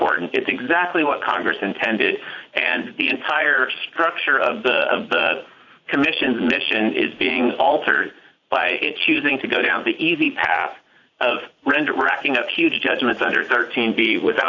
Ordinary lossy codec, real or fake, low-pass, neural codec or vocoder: AAC, 48 kbps; fake; 7.2 kHz; vocoder, 44.1 kHz, 80 mel bands, Vocos